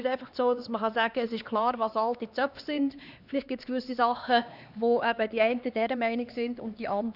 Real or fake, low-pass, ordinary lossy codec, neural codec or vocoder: fake; 5.4 kHz; none; codec, 16 kHz, 2 kbps, X-Codec, HuBERT features, trained on LibriSpeech